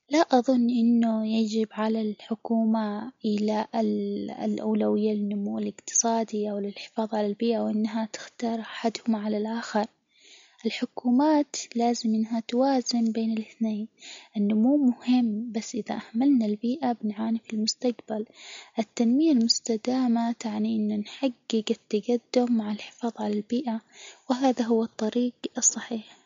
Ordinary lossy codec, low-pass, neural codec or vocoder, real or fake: MP3, 48 kbps; 7.2 kHz; none; real